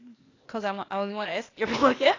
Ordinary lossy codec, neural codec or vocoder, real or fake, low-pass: AAC, 32 kbps; codec, 16 kHz, 0.8 kbps, ZipCodec; fake; 7.2 kHz